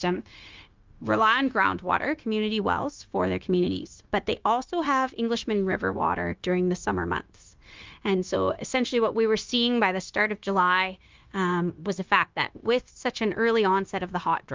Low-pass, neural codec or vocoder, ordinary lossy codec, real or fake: 7.2 kHz; codec, 16 kHz, 0.9 kbps, LongCat-Audio-Codec; Opus, 24 kbps; fake